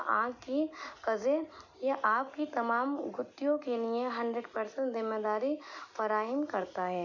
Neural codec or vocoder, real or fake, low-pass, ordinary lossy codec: none; real; 7.2 kHz; none